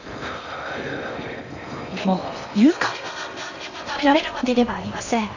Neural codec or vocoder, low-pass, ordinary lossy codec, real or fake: codec, 16 kHz in and 24 kHz out, 0.6 kbps, FocalCodec, streaming, 4096 codes; 7.2 kHz; none; fake